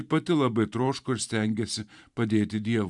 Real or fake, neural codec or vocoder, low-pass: fake; vocoder, 44.1 kHz, 128 mel bands every 512 samples, BigVGAN v2; 10.8 kHz